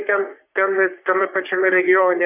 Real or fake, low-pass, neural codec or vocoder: fake; 3.6 kHz; codec, 44.1 kHz, 3.4 kbps, Pupu-Codec